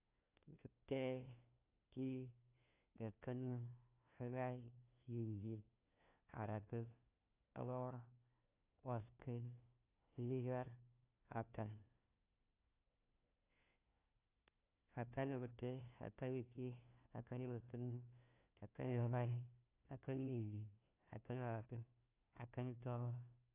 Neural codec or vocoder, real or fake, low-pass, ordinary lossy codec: codec, 16 kHz, 1 kbps, FunCodec, trained on LibriTTS, 50 frames a second; fake; 3.6 kHz; AAC, 24 kbps